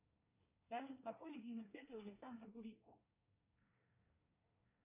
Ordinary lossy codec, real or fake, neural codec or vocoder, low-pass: AAC, 32 kbps; fake; codec, 24 kHz, 1 kbps, SNAC; 3.6 kHz